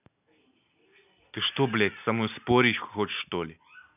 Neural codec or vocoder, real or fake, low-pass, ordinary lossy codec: none; real; 3.6 kHz; none